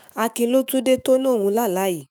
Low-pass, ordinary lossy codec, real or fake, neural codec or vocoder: none; none; fake; autoencoder, 48 kHz, 128 numbers a frame, DAC-VAE, trained on Japanese speech